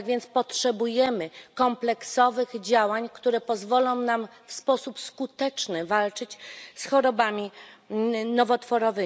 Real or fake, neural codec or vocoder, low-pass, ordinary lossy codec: real; none; none; none